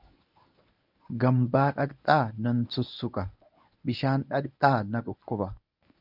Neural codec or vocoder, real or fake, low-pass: codec, 24 kHz, 0.9 kbps, WavTokenizer, medium speech release version 1; fake; 5.4 kHz